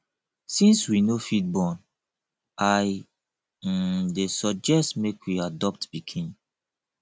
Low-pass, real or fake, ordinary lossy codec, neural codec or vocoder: none; real; none; none